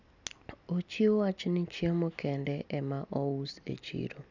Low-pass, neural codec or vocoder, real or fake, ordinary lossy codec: 7.2 kHz; none; real; none